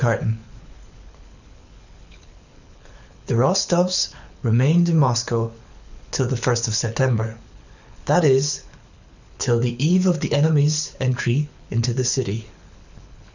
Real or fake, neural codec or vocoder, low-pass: fake; codec, 24 kHz, 6 kbps, HILCodec; 7.2 kHz